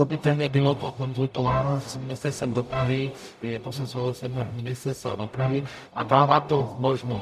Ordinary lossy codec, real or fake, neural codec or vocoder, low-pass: MP3, 96 kbps; fake; codec, 44.1 kHz, 0.9 kbps, DAC; 14.4 kHz